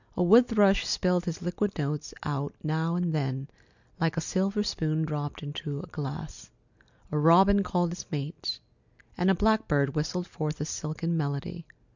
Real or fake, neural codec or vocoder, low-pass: real; none; 7.2 kHz